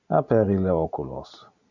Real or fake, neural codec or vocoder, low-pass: real; none; 7.2 kHz